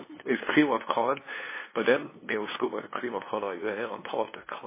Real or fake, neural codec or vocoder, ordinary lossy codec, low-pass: fake; codec, 16 kHz, 2 kbps, FunCodec, trained on LibriTTS, 25 frames a second; MP3, 16 kbps; 3.6 kHz